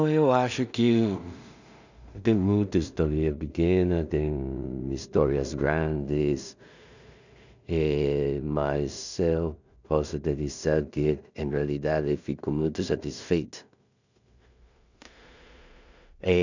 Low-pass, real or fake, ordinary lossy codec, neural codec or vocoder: 7.2 kHz; fake; none; codec, 16 kHz in and 24 kHz out, 0.4 kbps, LongCat-Audio-Codec, two codebook decoder